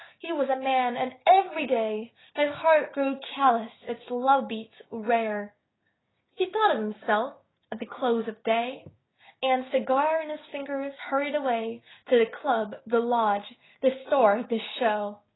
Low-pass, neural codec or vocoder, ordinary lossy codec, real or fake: 7.2 kHz; codec, 16 kHz, 6 kbps, DAC; AAC, 16 kbps; fake